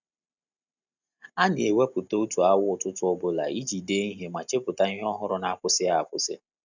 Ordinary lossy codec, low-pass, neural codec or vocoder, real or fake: none; 7.2 kHz; none; real